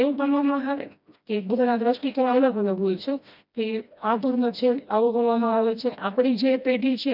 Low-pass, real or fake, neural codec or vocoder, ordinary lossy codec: 5.4 kHz; fake; codec, 16 kHz, 1 kbps, FreqCodec, smaller model; none